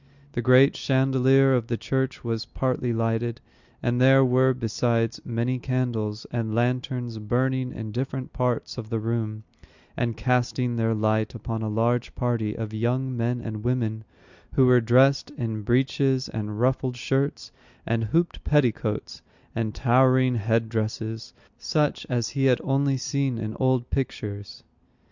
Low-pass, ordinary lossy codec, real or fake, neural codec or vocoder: 7.2 kHz; Opus, 64 kbps; real; none